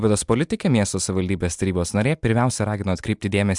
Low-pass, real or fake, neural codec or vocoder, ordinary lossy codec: 10.8 kHz; real; none; MP3, 96 kbps